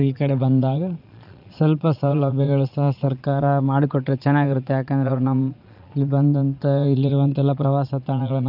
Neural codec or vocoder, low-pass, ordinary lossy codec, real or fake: vocoder, 22.05 kHz, 80 mel bands, Vocos; 5.4 kHz; none; fake